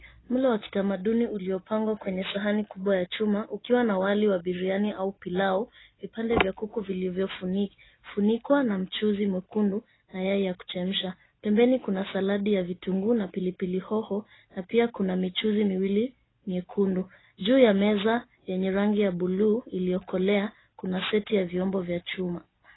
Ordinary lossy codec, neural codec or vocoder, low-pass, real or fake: AAC, 16 kbps; none; 7.2 kHz; real